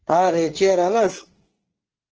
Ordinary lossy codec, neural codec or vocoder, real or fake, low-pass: Opus, 16 kbps; codec, 24 kHz, 1 kbps, SNAC; fake; 7.2 kHz